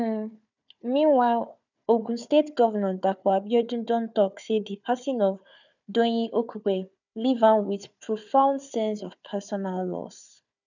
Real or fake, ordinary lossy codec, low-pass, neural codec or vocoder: fake; none; 7.2 kHz; codec, 16 kHz, 4 kbps, FunCodec, trained on Chinese and English, 50 frames a second